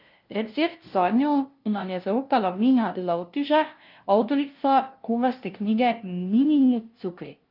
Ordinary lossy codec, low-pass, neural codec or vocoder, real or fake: Opus, 24 kbps; 5.4 kHz; codec, 16 kHz, 0.5 kbps, FunCodec, trained on LibriTTS, 25 frames a second; fake